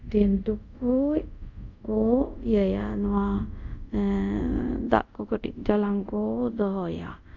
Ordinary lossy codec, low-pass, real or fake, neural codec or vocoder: none; 7.2 kHz; fake; codec, 24 kHz, 0.5 kbps, DualCodec